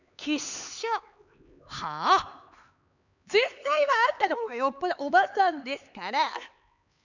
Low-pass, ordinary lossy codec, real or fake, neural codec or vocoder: 7.2 kHz; none; fake; codec, 16 kHz, 4 kbps, X-Codec, HuBERT features, trained on LibriSpeech